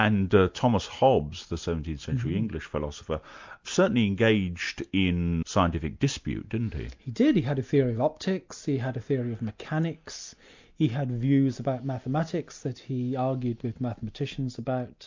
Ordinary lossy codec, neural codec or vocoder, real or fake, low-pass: MP3, 64 kbps; none; real; 7.2 kHz